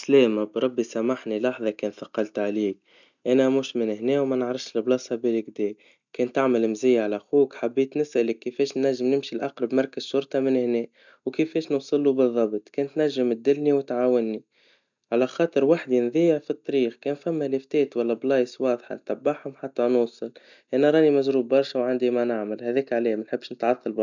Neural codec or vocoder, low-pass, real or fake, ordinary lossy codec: none; 7.2 kHz; real; none